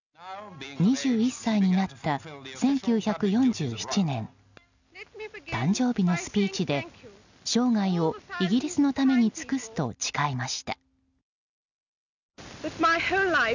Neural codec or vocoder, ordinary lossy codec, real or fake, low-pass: none; none; real; 7.2 kHz